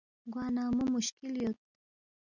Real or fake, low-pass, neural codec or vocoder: real; 7.2 kHz; none